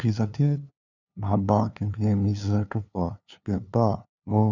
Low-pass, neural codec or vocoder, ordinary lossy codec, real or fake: 7.2 kHz; codec, 16 kHz, 2 kbps, FunCodec, trained on LibriTTS, 25 frames a second; none; fake